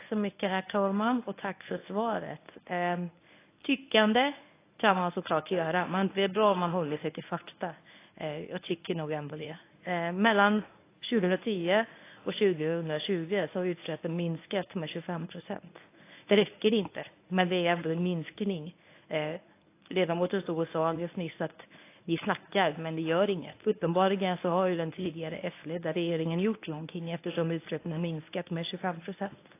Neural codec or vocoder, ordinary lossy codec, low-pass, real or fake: codec, 24 kHz, 0.9 kbps, WavTokenizer, medium speech release version 1; AAC, 24 kbps; 3.6 kHz; fake